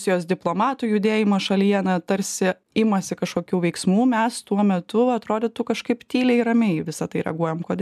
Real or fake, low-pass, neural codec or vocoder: real; 14.4 kHz; none